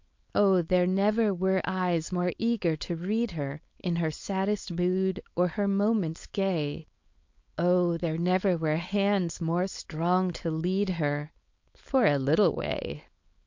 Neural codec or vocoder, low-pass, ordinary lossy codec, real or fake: codec, 16 kHz, 4.8 kbps, FACodec; 7.2 kHz; MP3, 48 kbps; fake